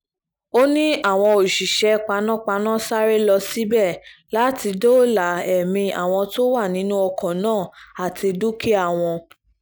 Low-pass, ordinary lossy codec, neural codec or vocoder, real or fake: none; none; none; real